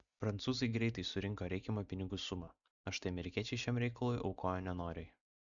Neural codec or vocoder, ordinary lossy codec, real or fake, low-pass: none; MP3, 96 kbps; real; 7.2 kHz